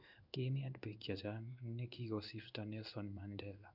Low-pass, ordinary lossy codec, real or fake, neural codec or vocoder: 5.4 kHz; none; fake; codec, 16 kHz in and 24 kHz out, 1 kbps, XY-Tokenizer